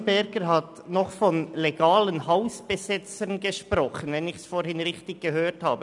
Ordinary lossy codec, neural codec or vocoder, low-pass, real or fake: none; none; 10.8 kHz; real